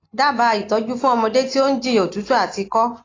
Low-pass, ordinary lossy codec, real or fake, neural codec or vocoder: 7.2 kHz; AAC, 32 kbps; real; none